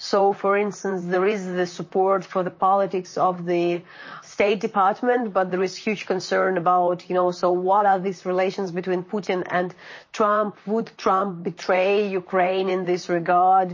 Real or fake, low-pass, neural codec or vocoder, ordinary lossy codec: fake; 7.2 kHz; vocoder, 44.1 kHz, 128 mel bands, Pupu-Vocoder; MP3, 32 kbps